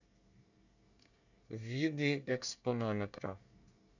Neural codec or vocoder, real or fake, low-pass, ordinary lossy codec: codec, 24 kHz, 1 kbps, SNAC; fake; 7.2 kHz; none